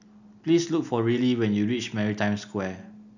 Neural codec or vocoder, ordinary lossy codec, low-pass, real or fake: none; none; 7.2 kHz; real